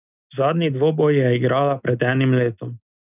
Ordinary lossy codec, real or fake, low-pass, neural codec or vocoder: none; real; 3.6 kHz; none